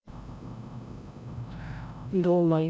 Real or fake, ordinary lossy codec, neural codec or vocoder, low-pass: fake; none; codec, 16 kHz, 0.5 kbps, FreqCodec, larger model; none